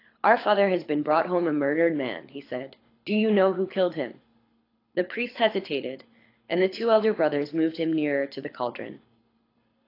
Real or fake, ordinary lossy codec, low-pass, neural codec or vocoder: fake; AAC, 32 kbps; 5.4 kHz; codec, 24 kHz, 6 kbps, HILCodec